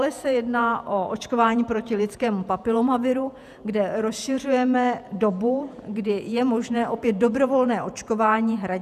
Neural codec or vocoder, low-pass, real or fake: vocoder, 48 kHz, 128 mel bands, Vocos; 14.4 kHz; fake